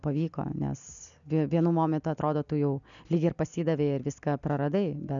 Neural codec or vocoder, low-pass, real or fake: none; 7.2 kHz; real